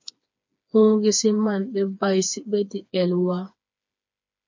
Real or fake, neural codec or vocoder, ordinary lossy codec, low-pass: fake; codec, 16 kHz, 4 kbps, FreqCodec, smaller model; MP3, 48 kbps; 7.2 kHz